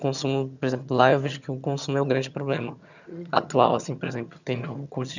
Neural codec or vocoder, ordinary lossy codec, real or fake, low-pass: vocoder, 22.05 kHz, 80 mel bands, HiFi-GAN; none; fake; 7.2 kHz